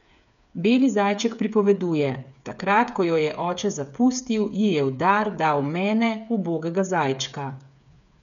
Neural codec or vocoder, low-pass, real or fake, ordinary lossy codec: codec, 16 kHz, 8 kbps, FreqCodec, smaller model; 7.2 kHz; fake; none